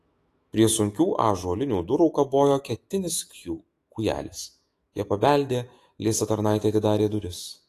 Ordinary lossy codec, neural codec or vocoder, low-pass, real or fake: AAC, 48 kbps; autoencoder, 48 kHz, 128 numbers a frame, DAC-VAE, trained on Japanese speech; 14.4 kHz; fake